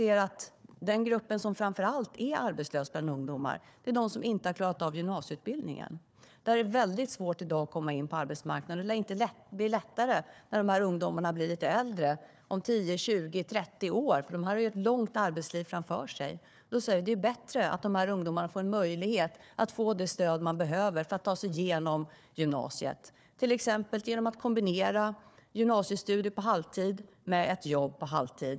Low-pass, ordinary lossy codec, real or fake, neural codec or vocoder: none; none; fake; codec, 16 kHz, 4 kbps, FunCodec, trained on Chinese and English, 50 frames a second